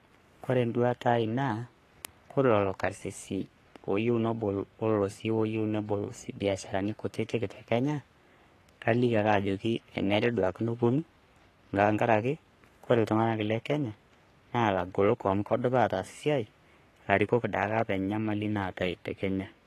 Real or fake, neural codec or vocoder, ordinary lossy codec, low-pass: fake; codec, 44.1 kHz, 3.4 kbps, Pupu-Codec; AAC, 48 kbps; 14.4 kHz